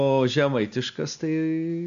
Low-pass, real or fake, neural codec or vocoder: 7.2 kHz; real; none